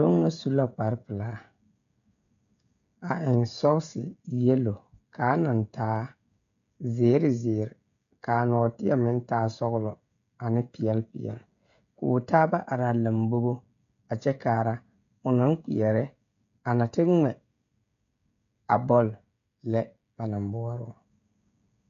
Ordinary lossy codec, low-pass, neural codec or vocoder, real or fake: AAC, 96 kbps; 7.2 kHz; codec, 16 kHz, 16 kbps, FreqCodec, smaller model; fake